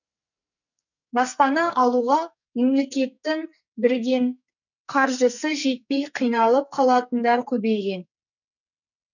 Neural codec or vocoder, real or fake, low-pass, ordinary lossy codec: codec, 44.1 kHz, 2.6 kbps, SNAC; fake; 7.2 kHz; none